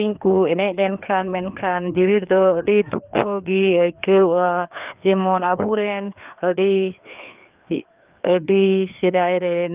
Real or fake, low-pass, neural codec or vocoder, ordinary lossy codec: fake; 3.6 kHz; codec, 16 kHz, 2 kbps, FreqCodec, larger model; Opus, 32 kbps